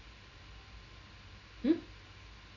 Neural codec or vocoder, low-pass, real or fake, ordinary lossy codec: none; 7.2 kHz; real; none